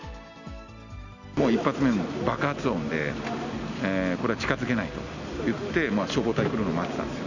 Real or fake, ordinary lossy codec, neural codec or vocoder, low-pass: real; none; none; 7.2 kHz